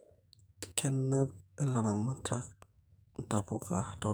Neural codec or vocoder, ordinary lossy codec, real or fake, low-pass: codec, 44.1 kHz, 2.6 kbps, SNAC; none; fake; none